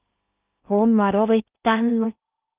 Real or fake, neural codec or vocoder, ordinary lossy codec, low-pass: fake; codec, 16 kHz in and 24 kHz out, 0.6 kbps, FocalCodec, streaming, 2048 codes; Opus, 24 kbps; 3.6 kHz